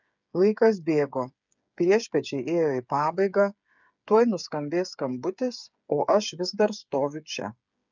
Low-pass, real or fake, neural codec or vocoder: 7.2 kHz; fake; codec, 16 kHz, 8 kbps, FreqCodec, smaller model